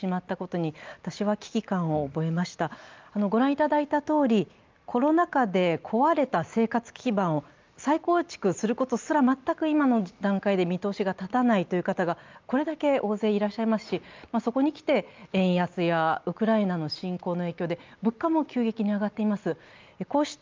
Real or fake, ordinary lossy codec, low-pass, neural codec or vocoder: real; Opus, 24 kbps; 7.2 kHz; none